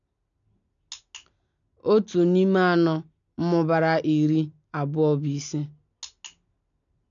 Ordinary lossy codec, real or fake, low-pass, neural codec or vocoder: none; real; 7.2 kHz; none